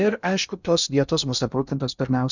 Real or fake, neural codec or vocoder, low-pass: fake; codec, 16 kHz in and 24 kHz out, 0.6 kbps, FocalCodec, streaming, 2048 codes; 7.2 kHz